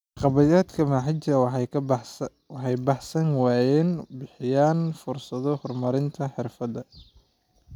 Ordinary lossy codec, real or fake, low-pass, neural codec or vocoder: none; real; 19.8 kHz; none